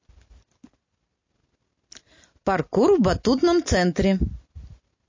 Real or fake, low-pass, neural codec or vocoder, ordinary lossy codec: real; 7.2 kHz; none; MP3, 32 kbps